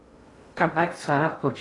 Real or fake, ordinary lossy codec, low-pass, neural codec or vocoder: fake; AAC, 32 kbps; 10.8 kHz; codec, 16 kHz in and 24 kHz out, 0.6 kbps, FocalCodec, streaming, 2048 codes